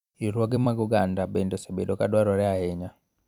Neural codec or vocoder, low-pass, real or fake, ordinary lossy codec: vocoder, 44.1 kHz, 128 mel bands every 256 samples, BigVGAN v2; 19.8 kHz; fake; none